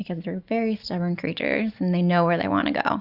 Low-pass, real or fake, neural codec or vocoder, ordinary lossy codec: 5.4 kHz; real; none; Opus, 64 kbps